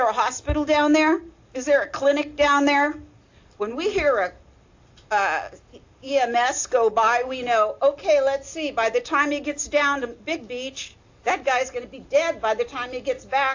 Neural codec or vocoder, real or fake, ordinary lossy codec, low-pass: none; real; AAC, 48 kbps; 7.2 kHz